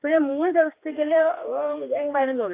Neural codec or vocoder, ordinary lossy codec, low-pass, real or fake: codec, 16 kHz, 2 kbps, X-Codec, HuBERT features, trained on general audio; AAC, 24 kbps; 3.6 kHz; fake